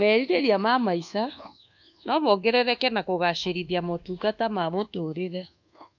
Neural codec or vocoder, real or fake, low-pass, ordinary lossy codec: codec, 24 kHz, 1.2 kbps, DualCodec; fake; 7.2 kHz; none